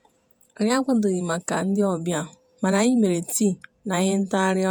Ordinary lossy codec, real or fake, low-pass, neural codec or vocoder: none; fake; none; vocoder, 48 kHz, 128 mel bands, Vocos